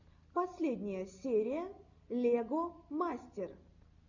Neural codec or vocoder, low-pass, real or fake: none; 7.2 kHz; real